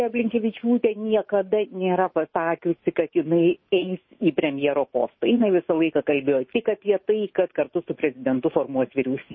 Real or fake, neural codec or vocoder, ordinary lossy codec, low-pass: fake; autoencoder, 48 kHz, 128 numbers a frame, DAC-VAE, trained on Japanese speech; MP3, 24 kbps; 7.2 kHz